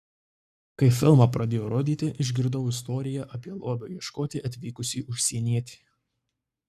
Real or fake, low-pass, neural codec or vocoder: fake; 14.4 kHz; codec, 44.1 kHz, 7.8 kbps, Pupu-Codec